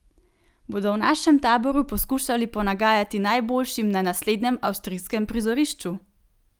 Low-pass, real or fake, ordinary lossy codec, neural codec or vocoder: 19.8 kHz; real; Opus, 32 kbps; none